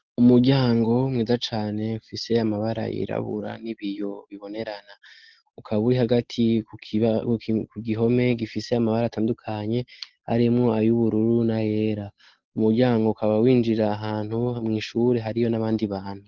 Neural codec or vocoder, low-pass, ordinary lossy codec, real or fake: none; 7.2 kHz; Opus, 16 kbps; real